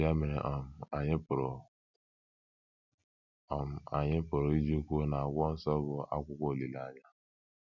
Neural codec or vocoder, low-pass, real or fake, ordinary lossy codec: none; 7.2 kHz; real; none